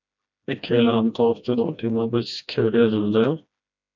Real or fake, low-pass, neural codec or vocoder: fake; 7.2 kHz; codec, 16 kHz, 1 kbps, FreqCodec, smaller model